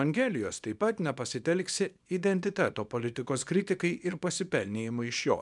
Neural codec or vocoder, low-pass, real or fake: codec, 24 kHz, 0.9 kbps, WavTokenizer, small release; 10.8 kHz; fake